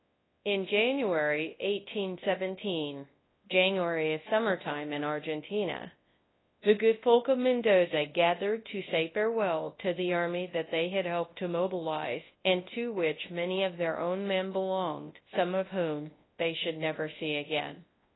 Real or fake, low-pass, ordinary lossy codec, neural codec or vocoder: fake; 7.2 kHz; AAC, 16 kbps; codec, 24 kHz, 0.9 kbps, WavTokenizer, large speech release